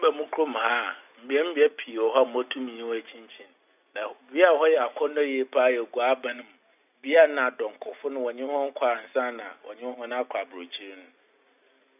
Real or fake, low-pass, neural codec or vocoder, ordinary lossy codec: real; 3.6 kHz; none; none